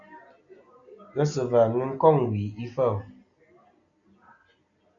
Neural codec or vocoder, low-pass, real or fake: none; 7.2 kHz; real